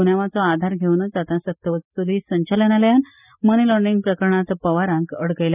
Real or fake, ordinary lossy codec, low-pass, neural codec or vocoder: real; none; 3.6 kHz; none